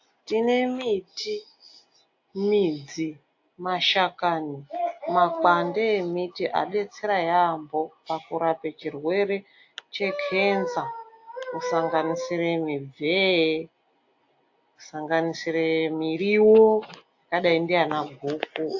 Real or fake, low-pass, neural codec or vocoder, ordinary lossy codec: real; 7.2 kHz; none; AAC, 48 kbps